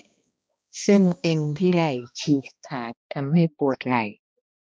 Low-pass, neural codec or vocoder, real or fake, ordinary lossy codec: none; codec, 16 kHz, 1 kbps, X-Codec, HuBERT features, trained on balanced general audio; fake; none